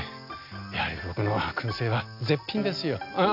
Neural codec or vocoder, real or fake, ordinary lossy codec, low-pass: none; real; none; 5.4 kHz